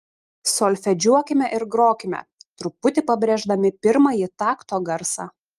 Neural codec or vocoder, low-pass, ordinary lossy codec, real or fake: none; 14.4 kHz; Opus, 32 kbps; real